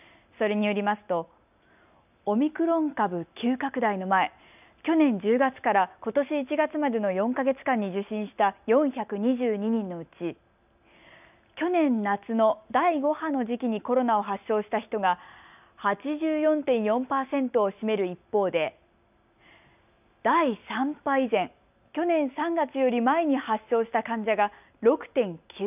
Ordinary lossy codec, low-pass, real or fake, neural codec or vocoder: none; 3.6 kHz; real; none